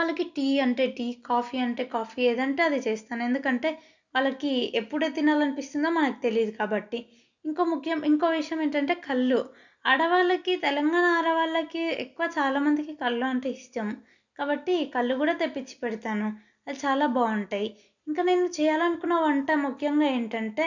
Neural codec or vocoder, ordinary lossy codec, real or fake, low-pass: none; none; real; 7.2 kHz